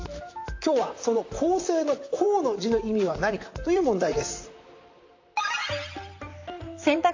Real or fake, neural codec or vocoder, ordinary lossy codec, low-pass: fake; vocoder, 44.1 kHz, 128 mel bands, Pupu-Vocoder; AAC, 48 kbps; 7.2 kHz